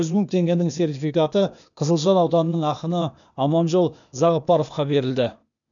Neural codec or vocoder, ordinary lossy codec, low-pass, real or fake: codec, 16 kHz, 0.8 kbps, ZipCodec; none; 7.2 kHz; fake